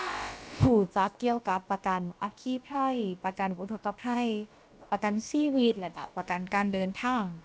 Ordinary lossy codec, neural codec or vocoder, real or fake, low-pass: none; codec, 16 kHz, about 1 kbps, DyCAST, with the encoder's durations; fake; none